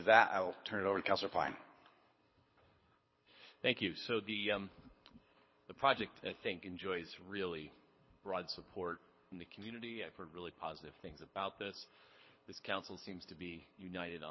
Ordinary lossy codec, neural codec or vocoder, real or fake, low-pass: MP3, 24 kbps; codec, 24 kHz, 6 kbps, HILCodec; fake; 7.2 kHz